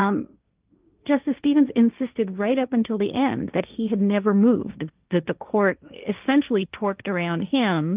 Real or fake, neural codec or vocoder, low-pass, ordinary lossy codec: fake; codec, 16 kHz, 1.1 kbps, Voila-Tokenizer; 3.6 kHz; Opus, 24 kbps